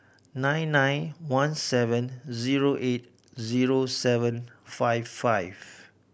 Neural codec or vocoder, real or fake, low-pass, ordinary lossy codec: none; real; none; none